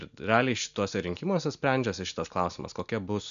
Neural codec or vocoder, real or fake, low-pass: none; real; 7.2 kHz